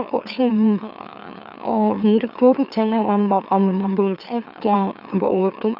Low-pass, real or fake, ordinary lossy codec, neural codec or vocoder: 5.4 kHz; fake; none; autoencoder, 44.1 kHz, a latent of 192 numbers a frame, MeloTTS